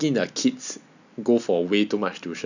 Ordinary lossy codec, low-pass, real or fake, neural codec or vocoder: none; 7.2 kHz; real; none